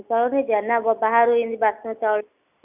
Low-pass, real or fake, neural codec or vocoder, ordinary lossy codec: 3.6 kHz; real; none; none